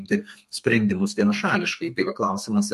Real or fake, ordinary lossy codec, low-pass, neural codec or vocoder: fake; MP3, 64 kbps; 14.4 kHz; codec, 32 kHz, 1.9 kbps, SNAC